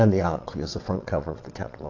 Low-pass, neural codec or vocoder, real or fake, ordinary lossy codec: 7.2 kHz; vocoder, 22.05 kHz, 80 mel bands, WaveNeXt; fake; AAC, 48 kbps